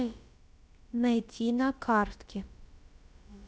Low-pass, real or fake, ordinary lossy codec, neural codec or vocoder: none; fake; none; codec, 16 kHz, about 1 kbps, DyCAST, with the encoder's durations